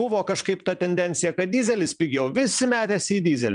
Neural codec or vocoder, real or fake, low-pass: vocoder, 22.05 kHz, 80 mel bands, WaveNeXt; fake; 9.9 kHz